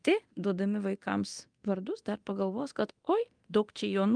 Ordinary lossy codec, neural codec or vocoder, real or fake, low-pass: Opus, 32 kbps; codec, 24 kHz, 0.9 kbps, DualCodec; fake; 9.9 kHz